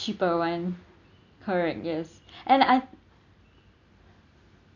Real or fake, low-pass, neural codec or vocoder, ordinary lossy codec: real; 7.2 kHz; none; none